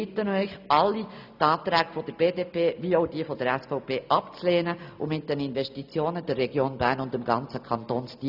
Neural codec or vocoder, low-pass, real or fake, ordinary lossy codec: none; 5.4 kHz; real; none